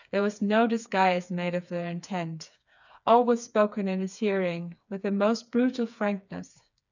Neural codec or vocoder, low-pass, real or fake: codec, 16 kHz, 4 kbps, FreqCodec, smaller model; 7.2 kHz; fake